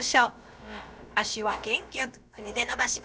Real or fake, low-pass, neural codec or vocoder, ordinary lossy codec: fake; none; codec, 16 kHz, about 1 kbps, DyCAST, with the encoder's durations; none